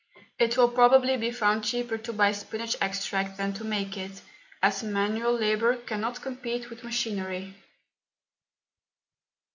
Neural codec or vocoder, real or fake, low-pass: none; real; 7.2 kHz